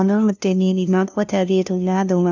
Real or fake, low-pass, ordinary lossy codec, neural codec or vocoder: fake; 7.2 kHz; none; codec, 16 kHz, 0.5 kbps, FunCodec, trained on LibriTTS, 25 frames a second